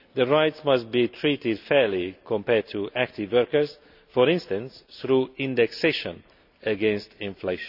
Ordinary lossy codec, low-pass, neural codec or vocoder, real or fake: none; 5.4 kHz; none; real